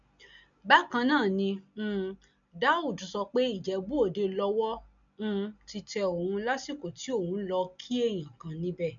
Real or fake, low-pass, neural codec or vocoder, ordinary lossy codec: real; 7.2 kHz; none; none